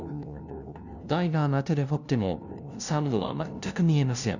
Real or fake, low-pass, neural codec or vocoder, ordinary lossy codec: fake; 7.2 kHz; codec, 16 kHz, 0.5 kbps, FunCodec, trained on LibriTTS, 25 frames a second; none